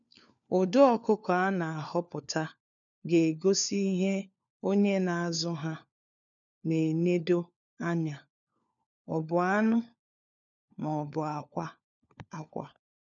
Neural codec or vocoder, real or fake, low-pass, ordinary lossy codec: codec, 16 kHz, 4 kbps, FunCodec, trained on LibriTTS, 50 frames a second; fake; 7.2 kHz; none